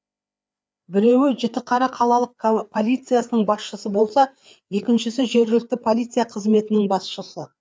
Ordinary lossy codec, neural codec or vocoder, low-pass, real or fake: none; codec, 16 kHz, 4 kbps, FreqCodec, larger model; none; fake